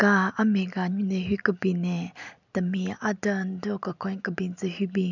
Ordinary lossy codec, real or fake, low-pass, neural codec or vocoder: none; real; 7.2 kHz; none